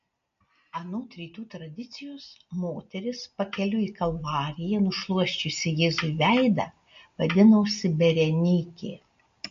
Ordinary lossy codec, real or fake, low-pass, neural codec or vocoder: MP3, 48 kbps; real; 7.2 kHz; none